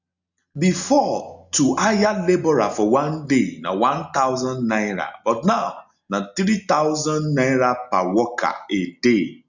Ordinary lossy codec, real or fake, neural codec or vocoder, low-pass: none; real; none; 7.2 kHz